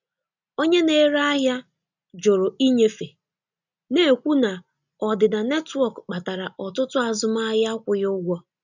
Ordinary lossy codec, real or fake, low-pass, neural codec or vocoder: none; real; 7.2 kHz; none